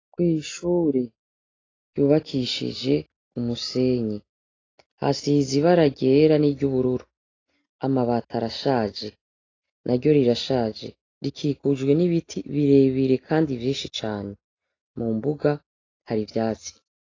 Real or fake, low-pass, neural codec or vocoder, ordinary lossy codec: real; 7.2 kHz; none; AAC, 32 kbps